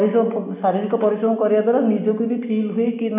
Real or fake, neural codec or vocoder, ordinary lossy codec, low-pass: real; none; none; 3.6 kHz